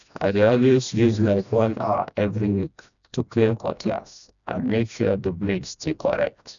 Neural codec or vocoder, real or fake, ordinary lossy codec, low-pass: codec, 16 kHz, 1 kbps, FreqCodec, smaller model; fake; none; 7.2 kHz